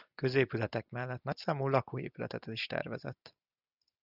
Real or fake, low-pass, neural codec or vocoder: real; 5.4 kHz; none